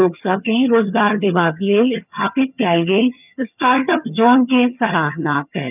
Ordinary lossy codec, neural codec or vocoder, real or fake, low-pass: none; vocoder, 22.05 kHz, 80 mel bands, HiFi-GAN; fake; 3.6 kHz